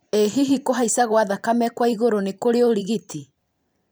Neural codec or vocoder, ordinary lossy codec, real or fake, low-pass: vocoder, 44.1 kHz, 128 mel bands every 512 samples, BigVGAN v2; none; fake; none